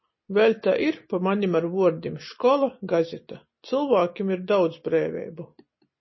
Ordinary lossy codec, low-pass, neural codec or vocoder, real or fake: MP3, 24 kbps; 7.2 kHz; none; real